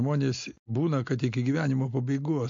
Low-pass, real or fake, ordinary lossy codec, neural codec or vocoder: 7.2 kHz; real; MP3, 48 kbps; none